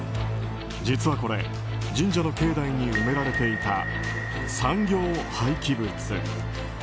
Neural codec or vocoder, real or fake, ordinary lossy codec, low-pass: none; real; none; none